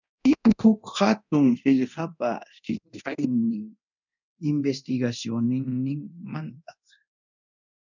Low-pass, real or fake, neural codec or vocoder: 7.2 kHz; fake; codec, 24 kHz, 0.9 kbps, DualCodec